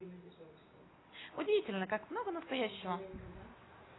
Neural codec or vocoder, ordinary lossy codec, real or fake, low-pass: none; AAC, 16 kbps; real; 7.2 kHz